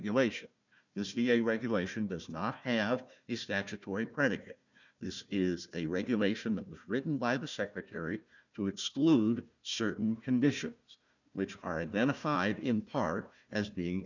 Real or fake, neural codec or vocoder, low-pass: fake; codec, 16 kHz, 1 kbps, FunCodec, trained on Chinese and English, 50 frames a second; 7.2 kHz